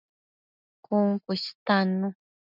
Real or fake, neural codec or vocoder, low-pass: real; none; 5.4 kHz